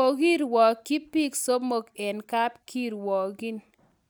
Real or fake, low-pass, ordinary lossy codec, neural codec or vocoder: real; none; none; none